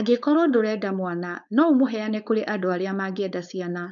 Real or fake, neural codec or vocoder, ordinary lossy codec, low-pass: fake; codec, 16 kHz, 4.8 kbps, FACodec; none; 7.2 kHz